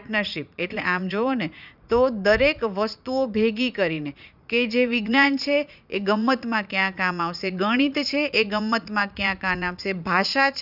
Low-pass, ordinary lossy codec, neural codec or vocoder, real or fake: 5.4 kHz; none; vocoder, 44.1 kHz, 80 mel bands, Vocos; fake